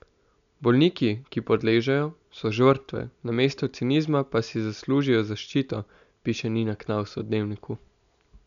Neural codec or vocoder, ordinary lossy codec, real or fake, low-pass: none; none; real; 7.2 kHz